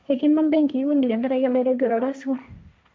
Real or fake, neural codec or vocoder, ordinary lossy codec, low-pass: fake; codec, 16 kHz, 1.1 kbps, Voila-Tokenizer; none; none